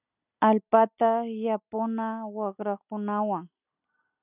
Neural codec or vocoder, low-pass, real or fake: none; 3.6 kHz; real